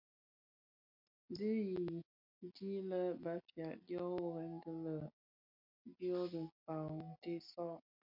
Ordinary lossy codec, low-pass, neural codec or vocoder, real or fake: MP3, 48 kbps; 5.4 kHz; none; real